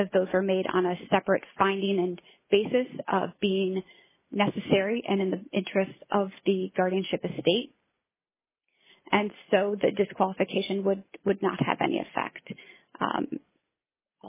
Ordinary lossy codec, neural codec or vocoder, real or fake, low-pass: MP3, 16 kbps; none; real; 3.6 kHz